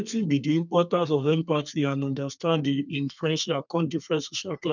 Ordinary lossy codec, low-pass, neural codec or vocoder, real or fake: none; 7.2 kHz; codec, 32 kHz, 1.9 kbps, SNAC; fake